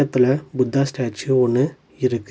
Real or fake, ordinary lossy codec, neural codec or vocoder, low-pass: real; none; none; none